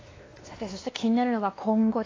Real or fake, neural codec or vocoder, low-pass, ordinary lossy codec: fake; codec, 16 kHz, 2 kbps, X-Codec, WavLM features, trained on Multilingual LibriSpeech; 7.2 kHz; AAC, 32 kbps